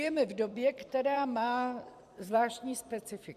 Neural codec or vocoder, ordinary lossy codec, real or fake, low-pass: vocoder, 44.1 kHz, 128 mel bands every 256 samples, BigVGAN v2; AAC, 96 kbps; fake; 14.4 kHz